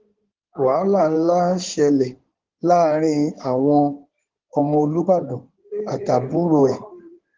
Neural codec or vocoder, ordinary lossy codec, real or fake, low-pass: codec, 16 kHz in and 24 kHz out, 2.2 kbps, FireRedTTS-2 codec; Opus, 16 kbps; fake; 7.2 kHz